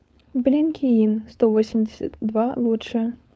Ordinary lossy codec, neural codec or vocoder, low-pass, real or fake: none; codec, 16 kHz, 4.8 kbps, FACodec; none; fake